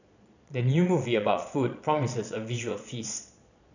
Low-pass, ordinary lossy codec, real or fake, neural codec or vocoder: 7.2 kHz; none; fake; vocoder, 22.05 kHz, 80 mel bands, Vocos